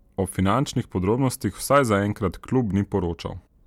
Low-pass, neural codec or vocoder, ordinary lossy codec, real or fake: 19.8 kHz; none; MP3, 96 kbps; real